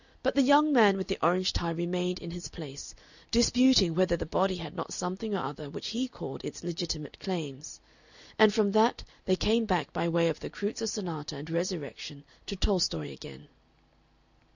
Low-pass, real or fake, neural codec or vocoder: 7.2 kHz; real; none